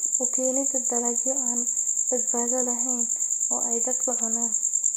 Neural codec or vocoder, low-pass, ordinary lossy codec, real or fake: none; none; none; real